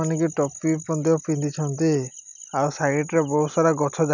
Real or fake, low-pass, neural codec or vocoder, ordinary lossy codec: real; 7.2 kHz; none; none